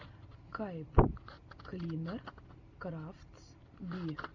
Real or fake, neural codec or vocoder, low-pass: real; none; 7.2 kHz